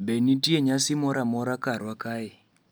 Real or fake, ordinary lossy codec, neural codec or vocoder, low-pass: real; none; none; none